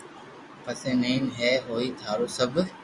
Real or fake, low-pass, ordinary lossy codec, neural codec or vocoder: real; 10.8 kHz; Opus, 64 kbps; none